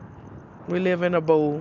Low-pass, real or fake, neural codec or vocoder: 7.2 kHz; real; none